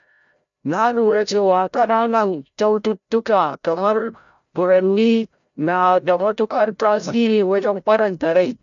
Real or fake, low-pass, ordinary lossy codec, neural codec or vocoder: fake; 7.2 kHz; none; codec, 16 kHz, 0.5 kbps, FreqCodec, larger model